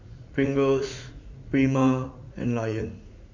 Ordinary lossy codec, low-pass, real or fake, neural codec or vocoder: MP3, 48 kbps; 7.2 kHz; fake; vocoder, 44.1 kHz, 80 mel bands, Vocos